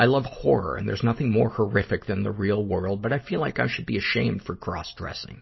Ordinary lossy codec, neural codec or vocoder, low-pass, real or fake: MP3, 24 kbps; none; 7.2 kHz; real